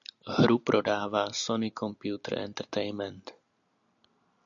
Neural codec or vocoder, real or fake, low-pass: none; real; 7.2 kHz